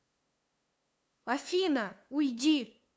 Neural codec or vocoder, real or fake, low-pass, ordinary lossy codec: codec, 16 kHz, 2 kbps, FunCodec, trained on LibriTTS, 25 frames a second; fake; none; none